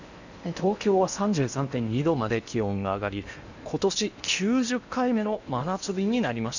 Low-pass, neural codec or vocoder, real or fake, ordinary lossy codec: 7.2 kHz; codec, 16 kHz in and 24 kHz out, 0.8 kbps, FocalCodec, streaming, 65536 codes; fake; none